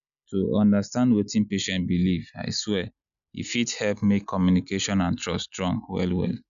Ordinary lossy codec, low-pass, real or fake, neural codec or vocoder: none; 7.2 kHz; real; none